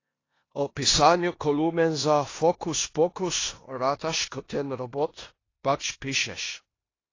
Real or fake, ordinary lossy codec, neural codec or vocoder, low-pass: fake; AAC, 32 kbps; codec, 16 kHz in and 24 kHz out, 0.9 kbps, LongCat-Audio-Codec, four codebook decoder; 7.2 kHz